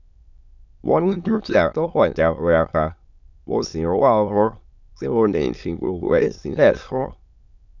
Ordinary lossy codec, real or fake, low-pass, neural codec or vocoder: none; fake; 7.2 kHz; autoencoder, 22.05 kHz, a latent of 192 numbers a frame, VITS, trained on many speakers